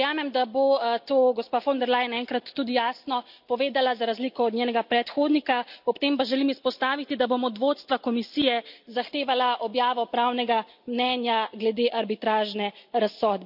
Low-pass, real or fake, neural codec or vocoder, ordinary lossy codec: 5.4 kHz; real; none; none